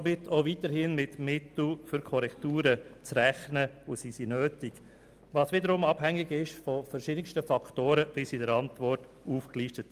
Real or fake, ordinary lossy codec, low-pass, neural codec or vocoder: real; Opus, 32 kbps; 14.4 kHz; none